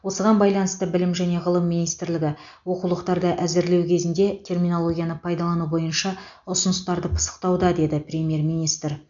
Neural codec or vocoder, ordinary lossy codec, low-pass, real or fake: none; MP3, 64 kbps; 7.2 kHz; real